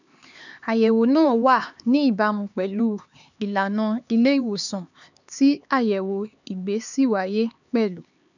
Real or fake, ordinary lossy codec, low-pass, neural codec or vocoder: fake; none; 7.2 kHz; codec, 16 kHz, 4 kbps, X-Codec, HuBERT features, trained on LibriSpeech